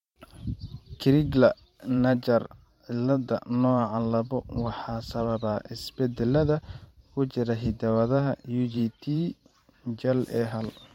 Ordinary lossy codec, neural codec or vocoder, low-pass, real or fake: MP3, 64 kbps; vocoder, 44.1 kHz, 128 mel bands every 512 samples, BigVGAN v2; 19.8 kHz; fake